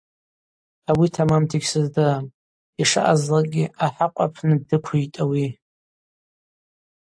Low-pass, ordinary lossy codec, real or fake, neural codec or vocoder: 9.9 kHz; AAC, 64 kbps; real; none